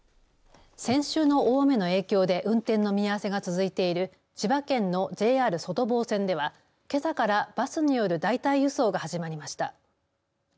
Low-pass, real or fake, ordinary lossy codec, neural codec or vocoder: none; real; none; none